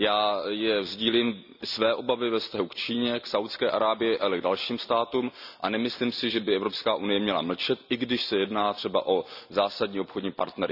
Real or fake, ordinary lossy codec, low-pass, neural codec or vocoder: real; none; 5.4 kHz; none